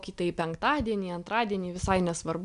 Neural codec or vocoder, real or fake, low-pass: none; real; 10.8 kHz